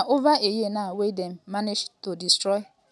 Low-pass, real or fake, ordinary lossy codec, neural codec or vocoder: none; real; none; none